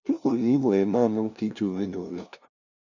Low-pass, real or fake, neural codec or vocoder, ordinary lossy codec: 7.2 kHz; fake; codec, 16 kHz, 0.5 kbps, FunCodec, trained on LibriTTS, 25 frames a second; none